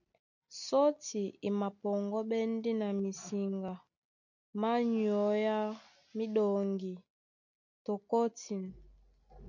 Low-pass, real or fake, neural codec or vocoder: 7.2 kHz; real; none